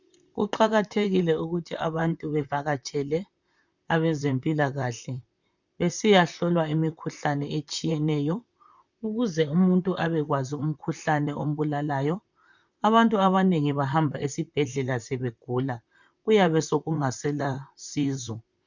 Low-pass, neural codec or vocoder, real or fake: 7.2 kHz; vocoder, 44.1 kHz, 128 mel bands, Pupu-Vocoder; fake